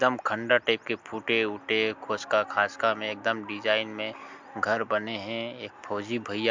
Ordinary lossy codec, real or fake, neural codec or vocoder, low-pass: MP3, 64 kbps; real; none; 7.2 kHz